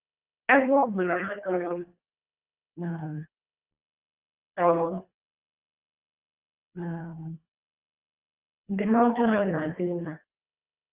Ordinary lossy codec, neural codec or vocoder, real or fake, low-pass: Opus, 32 kbps; codec, 24 kHz, 1.5 kbps, HILCodec; fake; 3.6 kHz